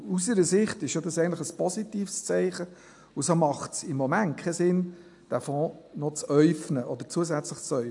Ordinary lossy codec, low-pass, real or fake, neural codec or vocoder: AAC, 64 kbps; 10.8 kHz; real; none